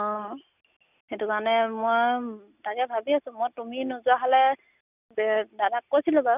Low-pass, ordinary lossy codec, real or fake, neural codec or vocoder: 3.6 kHz; none; real; none